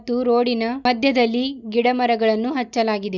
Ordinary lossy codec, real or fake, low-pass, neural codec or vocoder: none; real; 7.2 kHz; none